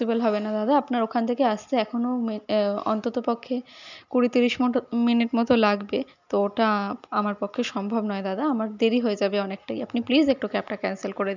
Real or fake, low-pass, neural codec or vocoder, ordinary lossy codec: real; 7.2 kHz; none; none